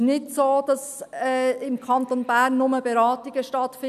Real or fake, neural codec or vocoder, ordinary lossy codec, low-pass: real; none; none; 14.4 kHz